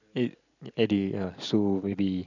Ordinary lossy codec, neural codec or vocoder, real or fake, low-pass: none; none; real; 7.2 kHz